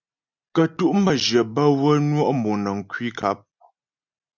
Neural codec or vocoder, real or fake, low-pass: none; real; 7.2 kHz